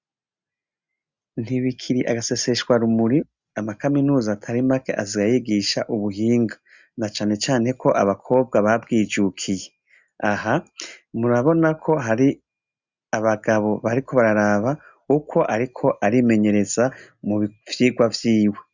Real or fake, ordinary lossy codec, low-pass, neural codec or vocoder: real; Opus, 64 kbps; 7.2 kHz; none